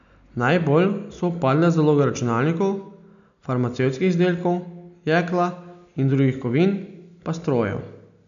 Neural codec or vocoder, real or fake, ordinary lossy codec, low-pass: none; real; none; 7.2 kHz